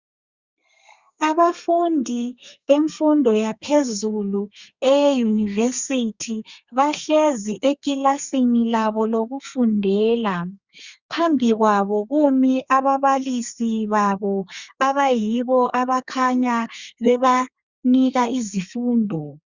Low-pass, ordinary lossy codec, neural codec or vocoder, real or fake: 7.2 kHz; Opus, 64 kbps; codec, 32 kHz, 1.9 kbps, SNAC; fake